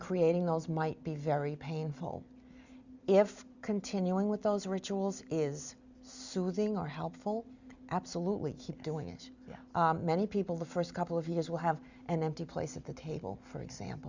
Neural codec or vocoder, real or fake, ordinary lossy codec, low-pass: none; real; Opus, 64 kbps; 7.2 kHz